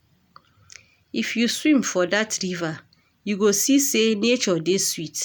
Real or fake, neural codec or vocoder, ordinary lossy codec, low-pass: real; none; none; none